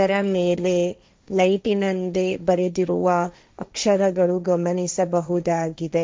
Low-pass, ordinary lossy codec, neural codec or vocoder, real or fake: none; none; codec, 16 kHz, 1.1 kbps, Voila-Tokenizer; fake